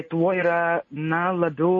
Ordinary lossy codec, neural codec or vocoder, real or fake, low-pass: MP3, 32 kbps; none; real; 7.2 kHz